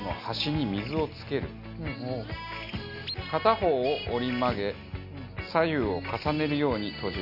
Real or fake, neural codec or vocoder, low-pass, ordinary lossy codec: real; none; 5.4 kHz; MP3, 48 kbps